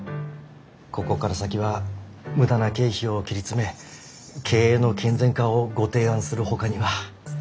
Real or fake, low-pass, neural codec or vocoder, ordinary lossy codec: real; none; none; none